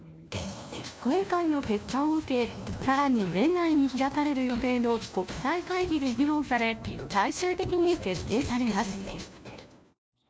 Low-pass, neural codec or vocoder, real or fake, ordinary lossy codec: none; codec, 16 kHz, 1 kbps, FunCodec, trained on LibriTTS, 50 frames a second; fake; none